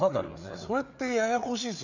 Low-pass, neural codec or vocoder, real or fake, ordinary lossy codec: 7.2 kHz; codec, 16 kHz, 4 kbps, FreqCodec, larger model; fake; none